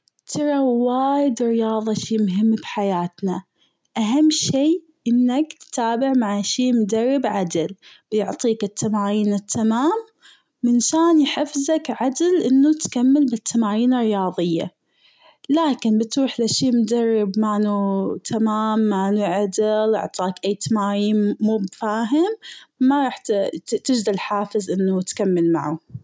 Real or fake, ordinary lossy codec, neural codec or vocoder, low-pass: real; none; none; none